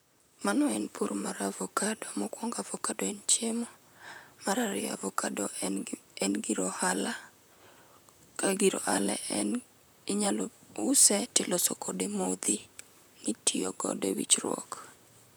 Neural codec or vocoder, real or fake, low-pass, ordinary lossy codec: vocoder, 44.1 kHz, 128 mel bands, Pupu-Vocoder; fake; none; none